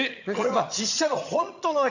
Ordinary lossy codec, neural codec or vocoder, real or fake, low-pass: none; vocoder, 22.05 kHz, 80 mel bands, HiFi-GAN; fake; 7.2 kHz